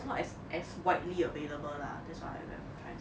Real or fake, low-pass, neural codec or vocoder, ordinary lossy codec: real; none; none; none